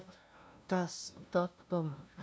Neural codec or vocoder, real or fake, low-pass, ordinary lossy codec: codec, 16 kHz, 0.5 kbps, FunCodec, trained on LibriTTS, 25 frames a second; fake; none; none